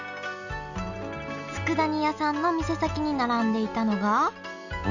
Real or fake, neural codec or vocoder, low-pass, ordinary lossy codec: real; none; 7.2 kHz; none